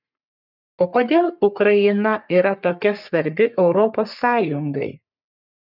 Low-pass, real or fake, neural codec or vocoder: 5.4 kHz; fake; codec, 44.1 kHz, 3.4 kbps, Pupu-Codec